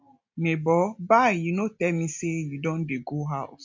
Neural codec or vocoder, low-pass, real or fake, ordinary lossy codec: none; 7.2 kHz; real; MP3, 48 kbps